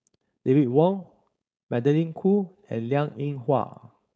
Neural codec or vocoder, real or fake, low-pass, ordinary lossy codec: codec, 16 kHz, 4.8 kbps, FACodec; fake; none; none